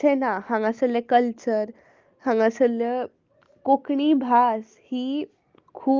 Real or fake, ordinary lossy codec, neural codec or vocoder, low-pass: real; Opus, 32 kbps; none; 7.2 kHz